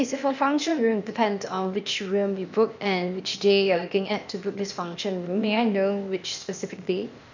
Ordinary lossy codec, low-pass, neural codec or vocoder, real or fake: none; 7.2 kHz; codec, 16 kHz, 0.8 kbps, ZipCodec; fake